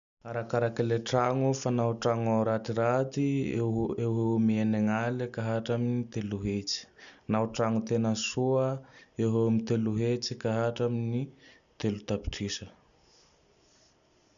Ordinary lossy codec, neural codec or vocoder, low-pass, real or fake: none; none; 7.2 kHz; real